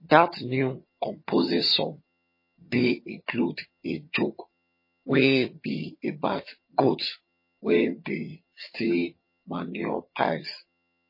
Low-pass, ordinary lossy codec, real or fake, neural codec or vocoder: 5.4 kHz; MP3, 24 kbps; fake; vocoder, 22.05 kHz, 80 mel bands, HiFi-GAN